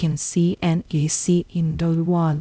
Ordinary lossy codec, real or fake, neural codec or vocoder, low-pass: none; fake; codec, 16 kHz, 0.5 kbps, X-Codec, HuBERT features, trained on LibriSpeech; none